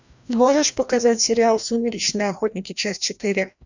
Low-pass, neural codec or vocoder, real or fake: 7.2 kHz; codec, 16 kHz, 1 kbps, FreqCodec, larger model; fake